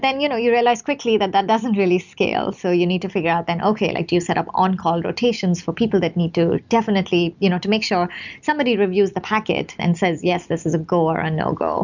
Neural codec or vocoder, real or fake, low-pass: none; real; 7.2 kHz